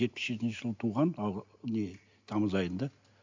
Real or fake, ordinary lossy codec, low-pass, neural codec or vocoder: real; MP3, 64 kbps; 7.2 kHz; none